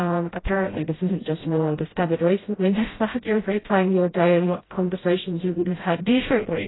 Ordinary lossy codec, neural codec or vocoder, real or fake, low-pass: AAC, 16 kbps; codec, 16 kHz, 0.5 kbps, FreqCodec, smaller model; fake; 7.2 kHz